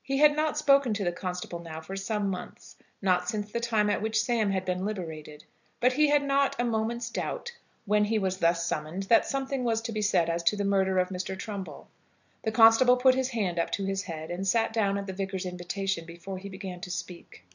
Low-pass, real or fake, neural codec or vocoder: 7.2 kHz; real; none